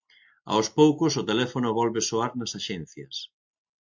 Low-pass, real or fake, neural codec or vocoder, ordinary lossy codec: 7.2 kHz; real; none; MP3, 48 kbps